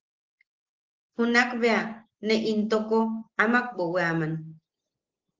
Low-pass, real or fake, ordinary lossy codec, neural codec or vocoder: 7.2 kHz; real; Opus, 16 kbps; none